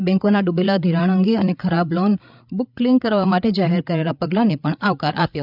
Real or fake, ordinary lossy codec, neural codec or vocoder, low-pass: fake; none; codec, 16 kHz, 8 kbps, FreqCodec, larger model; 5.4 kHz